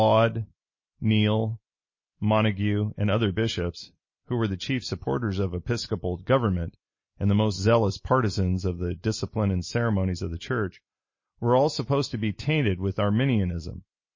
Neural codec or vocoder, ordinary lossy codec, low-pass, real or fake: none; MP3, 32 kbps; 7.2 kHz; real